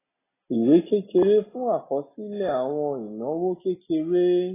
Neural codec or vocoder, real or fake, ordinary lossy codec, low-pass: none; real; AAC, 16 kbps; 3.6 kHz